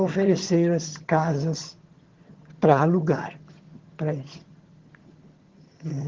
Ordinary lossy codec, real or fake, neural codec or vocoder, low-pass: Opus, 16 kbps; fake; vocoder, 22.05 kHz, 80 mel bands, HiFi-GAN; 7.2 kHz